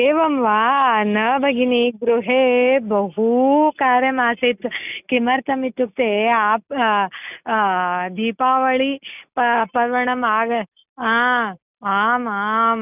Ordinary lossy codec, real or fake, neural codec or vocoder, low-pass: none; real; none; 3.6 kHz